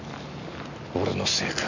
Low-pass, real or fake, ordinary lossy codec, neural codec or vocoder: 7.2 kHz; real; none; none